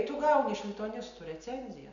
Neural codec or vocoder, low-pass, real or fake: none; 7.2 kHz; real